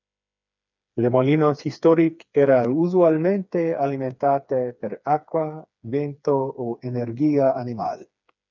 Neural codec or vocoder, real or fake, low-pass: codec, 16 kHz, 4 kbps, FreqCodec, smaller model; fake; 7.2 kHz